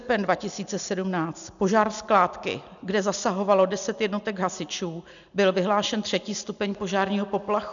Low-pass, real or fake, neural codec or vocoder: 7.2 kHz; real; none